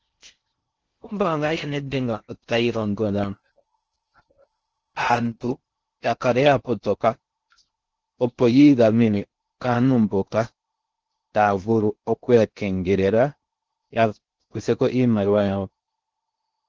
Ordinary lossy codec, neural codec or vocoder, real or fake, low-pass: Opus, 16 kbps; codec, 16 kHz in and 24 kHz out, 0.6 kbps, FocalCodec, streaming, 4096 codes; fake; 7.2 kHz